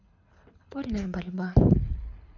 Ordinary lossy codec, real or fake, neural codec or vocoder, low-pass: none; fake; codec, 24 kHz, 6 kbps, HILCodec; 7.2 kHz